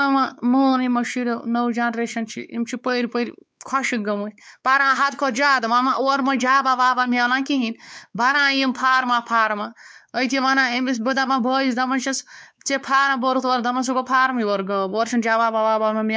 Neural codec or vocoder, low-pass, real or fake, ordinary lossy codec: codec, 16 kHz, 4 kbps, X-Codec, WavLM features, trained on Multilingual LibriSpeech; none; fake; none